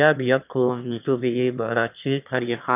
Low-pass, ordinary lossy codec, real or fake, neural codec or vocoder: 3.6 kHz; none; fake; autoencoder, 22.05 kHz, a latent of 192 numbers a frame, VITS, trained on one speaker